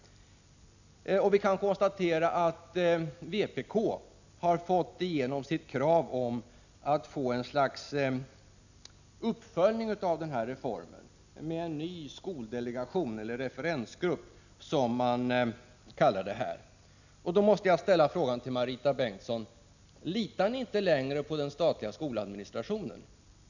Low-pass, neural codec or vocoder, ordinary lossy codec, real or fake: 7.2 kHz; none; none; real